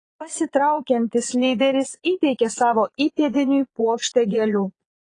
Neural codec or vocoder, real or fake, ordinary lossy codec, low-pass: vocoder, 22.05 kHz, 80 mel bands, Vocos; fake; AAC, 32 kbps; 9.9 kHz